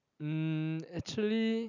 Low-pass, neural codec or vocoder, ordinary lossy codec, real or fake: 7.2 kHz; none; none; real